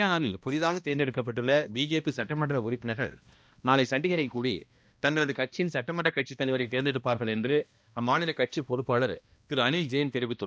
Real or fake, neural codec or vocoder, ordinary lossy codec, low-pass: fake; codec, 16 kHz, 1 kbps, X-Codec, HuBERT features, trained on balanced general audio; none; none